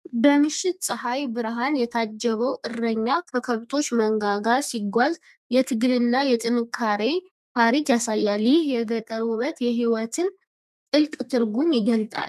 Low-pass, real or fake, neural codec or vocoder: 14.4 kHz; fake; codec, 32 kHz, 1.9 kbps, SNAC